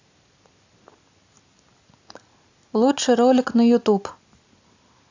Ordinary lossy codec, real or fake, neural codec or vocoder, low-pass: AAC, 48 kbps; real; none; 7.2 kHz